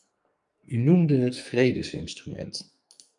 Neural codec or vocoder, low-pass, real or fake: codec, 44.1 kHz, 2.6 kbps, SNAC; 10.8 kHz; fake